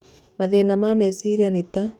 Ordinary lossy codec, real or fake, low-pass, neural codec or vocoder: none; fake; 19.8 kHz; codec, 44.1 kHz, 2.6 kbps, DAC